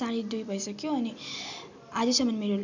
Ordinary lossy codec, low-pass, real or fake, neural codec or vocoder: none; 7.2 kHz; real; none